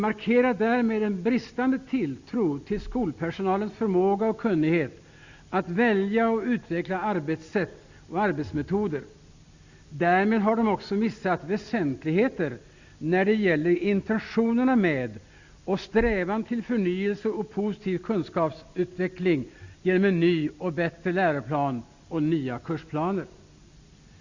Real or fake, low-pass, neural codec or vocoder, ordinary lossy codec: real; 7.2 kHz; none; Opus, 64 kbps